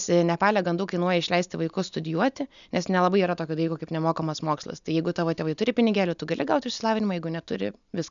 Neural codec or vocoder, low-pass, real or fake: none; 7.2 kHz; real